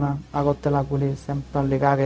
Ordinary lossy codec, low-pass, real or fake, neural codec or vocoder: none; none; fake; codec, 16 kHz, 0.4 kbps, LongCat-Audio-Codec